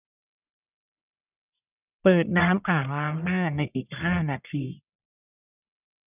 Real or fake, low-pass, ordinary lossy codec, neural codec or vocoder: fake; 3.6 kHz; none; codec, 44.1 kHz, 1.7 kbps, Pupu-Codec